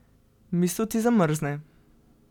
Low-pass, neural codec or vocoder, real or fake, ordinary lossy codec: 19.8 kHz; none; real; none